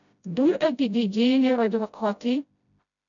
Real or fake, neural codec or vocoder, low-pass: fake; codec, 16 kHz, 0.5 kbps, FreqCodec, smaller model; 7.2 kHz